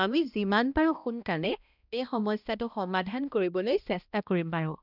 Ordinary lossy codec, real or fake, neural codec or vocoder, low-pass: none; fake; codec, 16 kHz, 1 kbps, X-Codec, HuBERT features, trained on balanced general audio; 5.4 kHz